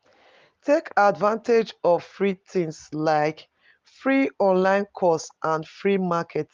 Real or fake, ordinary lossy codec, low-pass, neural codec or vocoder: real; Opus, 32 kbps; 7.2 kHz; none